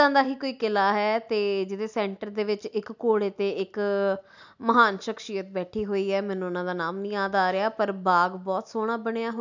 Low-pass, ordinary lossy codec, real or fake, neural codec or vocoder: 7.2 kHz; none; real; none